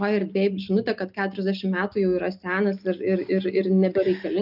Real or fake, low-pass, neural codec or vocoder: real; 5.4 kHz; none